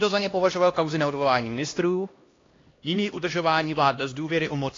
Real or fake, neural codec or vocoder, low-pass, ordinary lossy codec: fake; codec, 16 kHz, 1 kbps, X-Codec, HuBERT features, trained on LibriSpeech; 7.2 kHz; AAC, 32 kbps